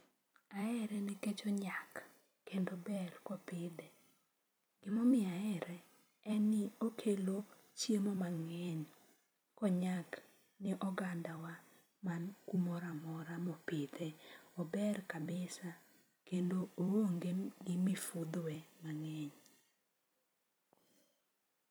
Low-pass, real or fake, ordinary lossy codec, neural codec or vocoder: none; fake; none; vocoder, 44.1 kHz, 128 mel bands every 256 samples, BigVGAN v2